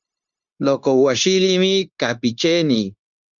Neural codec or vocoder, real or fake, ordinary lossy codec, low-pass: codec, 16 kHz, 0.9 kbps, LongCat-Audio-Codec; fake; Opus, 64 kbps; 7.2 kHz